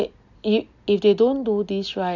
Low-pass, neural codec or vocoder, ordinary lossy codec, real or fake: 7.2 kHz; none; none; real